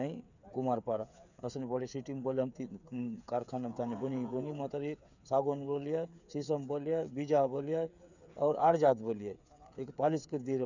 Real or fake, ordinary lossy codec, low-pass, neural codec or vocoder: fake; none; 7.2 kHz; codec, 16 kHz, 8 kbps, FreqCodec, smaller model